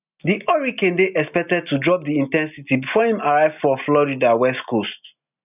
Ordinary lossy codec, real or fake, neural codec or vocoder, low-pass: none; real; none; 3.6 kHz